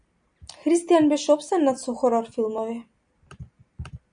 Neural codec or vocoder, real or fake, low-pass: none; real; 9.9 kHz